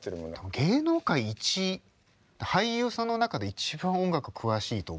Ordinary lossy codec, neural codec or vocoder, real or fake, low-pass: none; none; real; none